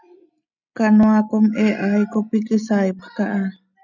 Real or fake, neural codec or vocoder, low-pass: real; none; 7.2 kHz